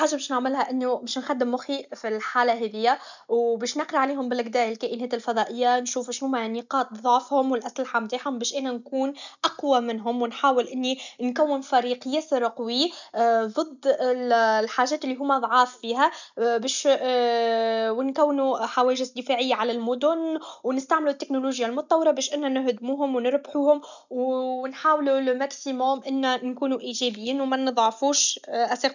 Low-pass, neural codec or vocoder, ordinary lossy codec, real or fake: 7.2 kHz; none; none; real